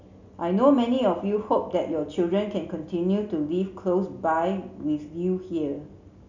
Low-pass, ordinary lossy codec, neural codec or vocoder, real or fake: 7.2 kHz; none; none; real